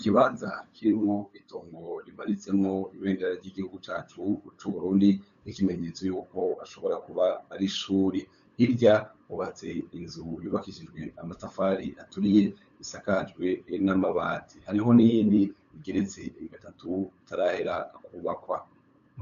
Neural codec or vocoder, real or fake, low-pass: codec, 16 kHz, 8 kbps, FunCodec, trained on LibriTTS, 25 frames a second; fake; 7.2 kHz